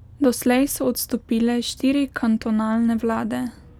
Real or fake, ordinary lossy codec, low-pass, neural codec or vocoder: real; none; 19.8 kHz; none